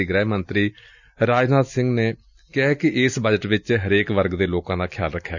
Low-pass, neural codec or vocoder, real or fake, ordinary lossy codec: 7.2 kHz; none; real; none